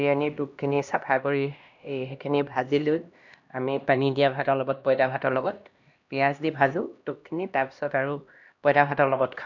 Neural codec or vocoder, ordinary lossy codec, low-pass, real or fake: codec, 16 kHz, 1 kbps, X-Codec, HuBERT features, trained on LibriSpeech; none; 7.2 kHz; fake